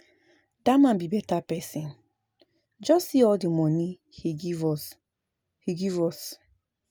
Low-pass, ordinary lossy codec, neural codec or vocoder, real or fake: none; none; none; real